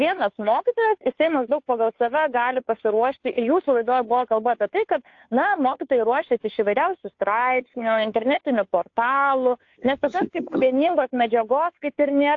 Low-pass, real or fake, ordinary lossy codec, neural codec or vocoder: 7.2 kHz; fake; AAC, 48 kbps; codec, 16 kHz, 2 kbps, FunCodec, trained on Chinese and English, 25 frames a second